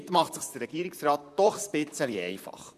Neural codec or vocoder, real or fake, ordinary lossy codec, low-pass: vocoder, 44.1 kHz, 128 mel bands, Pupu-Vocoder; fake; MP3, 96 kbps; 14.4 kHz